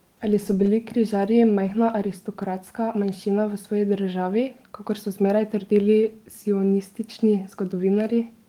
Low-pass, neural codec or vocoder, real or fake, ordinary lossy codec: 19.8 kHz; codec, 44.1 kHz, 7.8 kbps, DAC; fake; Opus, 24 kbps